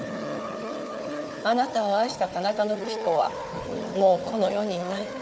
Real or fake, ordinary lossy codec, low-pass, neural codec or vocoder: fake; none; none; codec, 16 kHz, 4 kbps, FunCodec, trained on Chinese and English, 50 frames a second